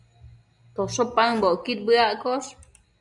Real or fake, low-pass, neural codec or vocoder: real; 10.8 kHz; none